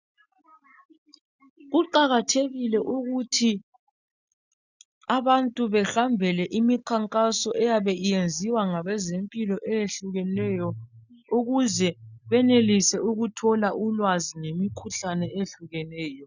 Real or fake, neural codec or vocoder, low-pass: real; none; 7.2 kHz